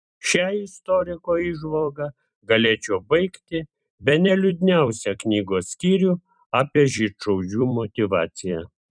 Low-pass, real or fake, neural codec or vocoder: 9.9 kHz; fake; vocoder, 44.1 kHz, 128 mel bands every 256 samples, BigVGAN v2